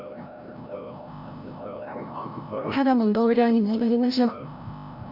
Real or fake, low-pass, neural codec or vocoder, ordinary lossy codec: fake; 5.4 kHz; codec, 16 kHz, 0.5 kbps, FreqCodec, larger model; none